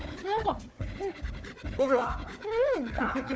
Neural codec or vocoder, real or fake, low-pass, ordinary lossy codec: codec, 16 kHz, 4 kbps, FunCodec, trained on Chinese and English, 50 frames a second; fake; none; none